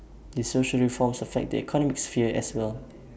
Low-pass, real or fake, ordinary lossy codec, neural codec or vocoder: none; real; none; none